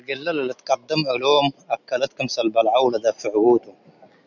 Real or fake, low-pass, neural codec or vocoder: real; 7.2 kHz; none